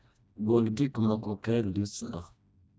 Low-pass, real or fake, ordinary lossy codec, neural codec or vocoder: none; fake; none; codec, 16 kHz, 1 kbps, FreqCodec, smaller model